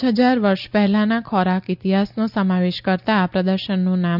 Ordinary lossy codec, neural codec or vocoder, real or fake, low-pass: none; none; real; 5.4 kHz